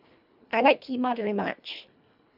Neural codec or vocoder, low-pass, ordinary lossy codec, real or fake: codec, 24 kHz, 1.5 kbps, HILCodec; 5.4 kHz; none; fake